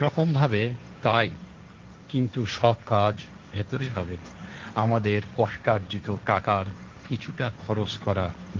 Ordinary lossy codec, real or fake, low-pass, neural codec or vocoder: Opus, 32 kbps; fake; 7.2 kHz; codec, 16 kHz, 1.1 kbps, Voila-Tokenizer